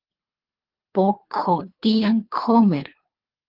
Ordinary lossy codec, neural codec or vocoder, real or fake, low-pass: Opus, 32 kbps; codec, 24 kHz, 3 kbps, HILCodec; fake; 5.4 kHz